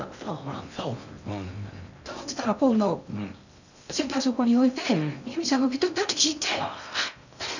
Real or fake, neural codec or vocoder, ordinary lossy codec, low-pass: fake; codec, 16 kHz in and 24 kHz out, 0.6 kbps, FocalCodec, streaming, 2048 codes; none; 7.2 kHz